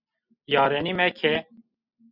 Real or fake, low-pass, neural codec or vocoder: real; 5.4 kHz; none